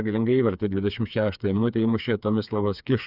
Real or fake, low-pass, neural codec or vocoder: fake; 5.4 kHz; codec, 16 kHz, 4 kbps, FreqCodec, smaller model